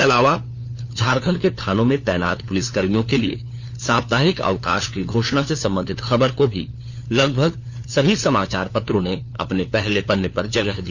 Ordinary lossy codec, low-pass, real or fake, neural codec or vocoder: Opus, 64 kbps; 7.2 kHz; fake; codec, 16 kHz, 4 kbps, FunCodec, trained on LibriTTS, 50 frames a second